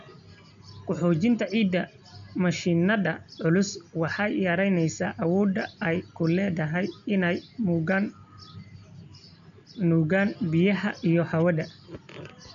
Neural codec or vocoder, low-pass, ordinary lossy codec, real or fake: none; 7.2 kHz; none; real